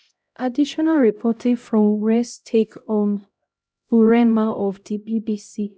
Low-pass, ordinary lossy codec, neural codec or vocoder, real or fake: none; none; codec, 16 kHz, 0.5 kbps, X-Codec, HuBERT features, trained on LibriSpeech; fake